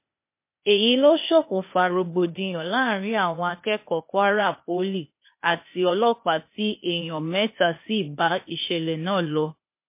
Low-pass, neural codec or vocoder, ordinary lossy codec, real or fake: 3.6 kHz; codec, 16 kHz, 0.8 kbps, ZipCodec; MP3, 24 kbps; fake